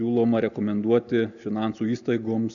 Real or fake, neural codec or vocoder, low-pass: real; none; 7.2 kHz